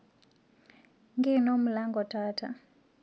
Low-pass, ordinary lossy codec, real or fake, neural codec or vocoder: none; none; real; none